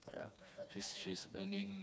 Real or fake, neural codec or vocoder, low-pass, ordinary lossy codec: fake; codec, 16 kHz, 2 kbps, FreqCodec, smaller model; none; none